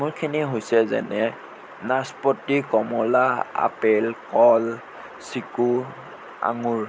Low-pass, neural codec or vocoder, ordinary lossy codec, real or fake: none; none; none; real